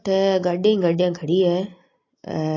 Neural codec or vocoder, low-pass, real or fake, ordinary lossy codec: none; 7.2 kHz; real; AAC, 32 kbps